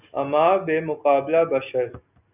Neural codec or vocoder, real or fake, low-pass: none; real; 3.6 kHz